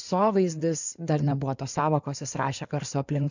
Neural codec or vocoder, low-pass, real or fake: codec, 16 kHz in and 24 kHz out, 2.2 kbps, FireRedTTS-2 codec; 7.2 kHz; fake